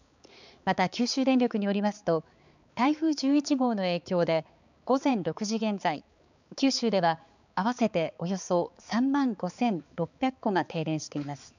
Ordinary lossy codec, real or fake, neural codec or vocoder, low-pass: none; fake; codec, 16 kHz, 4 kbps, X-Codec, HuBERT features, trained on balanced general audio; 7.2 kHz